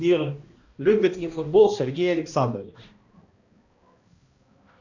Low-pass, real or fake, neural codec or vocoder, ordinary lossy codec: 7.2 kHz; fake; codec, 16 kHz, 1 kbps, X-Codec, HuBERT features, trained on balanced general audio; Opus, 64 kbps